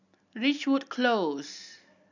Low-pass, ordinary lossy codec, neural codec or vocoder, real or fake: 7.2 kHz; none; none; real